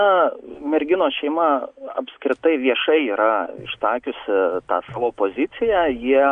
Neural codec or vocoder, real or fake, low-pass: none; real; 9.9 kHz